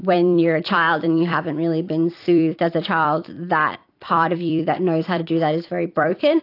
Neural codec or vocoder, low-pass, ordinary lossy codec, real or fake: vocoder, 44.1 kHz, 128 mel bands every 256 samples, BigVGAN v2; 5.4 kHz; AAC, 32 kbps; fake